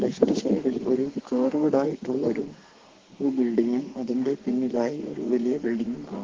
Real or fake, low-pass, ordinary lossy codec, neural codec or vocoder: fake; 7.2 kHz; Opus, 16 kbps; codec, 32 kHz, 1.9 kbps, SNAC